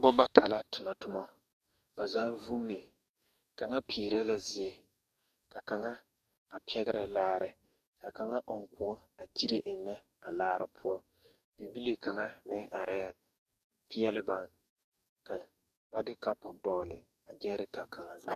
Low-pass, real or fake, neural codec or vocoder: 14.4 kHz; fake; codec, 44.1 kHz, 2.6 kbps, DAC